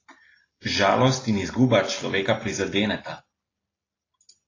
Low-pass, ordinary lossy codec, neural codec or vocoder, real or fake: 7.2 kHz; AAC, 32 kbps; none; real